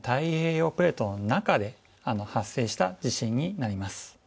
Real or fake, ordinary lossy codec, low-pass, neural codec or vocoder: real; none; none; none